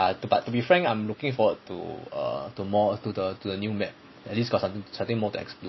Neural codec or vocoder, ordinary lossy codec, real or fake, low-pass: none; MP3, 24 kbps; real; 7.2 kHz